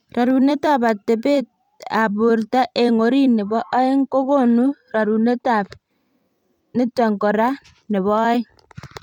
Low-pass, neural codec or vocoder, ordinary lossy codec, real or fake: 19.8 kHz; vocoder, 44.1 kHz, 128 mel bands every 256 samples, BigVGAN v2; none; fake